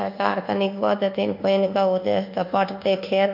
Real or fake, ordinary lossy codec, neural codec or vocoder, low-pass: fake; none; codec, 24 kHz, 1.2 kbps, DualCodec; 5.4 kHz